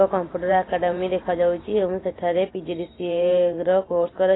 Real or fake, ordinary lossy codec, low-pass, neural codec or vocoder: fake; AAC, 16 kbps; 7.2 kHz; vocoder, 44.1 kHz, 128 mel bands every 512 samples, BigVGAN v2